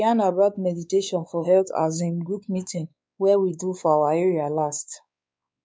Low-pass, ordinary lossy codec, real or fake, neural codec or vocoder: none; none; fake; codec, 16 kHz, 4 kbps, X-Codec, WavLM features, trained on Multilingual LibriSpeech